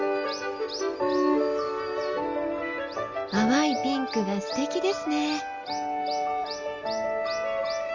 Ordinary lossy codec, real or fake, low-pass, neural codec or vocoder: Opus, 32 kbps; real; 7.2 kHz; none